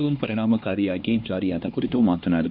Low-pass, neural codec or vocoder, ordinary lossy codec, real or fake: 5.4 kHz; codec, 16 kHz, 2 kbps, X-Codec, HuBERT features, trained on LibriSpeech; AAC, 48 kbps; fake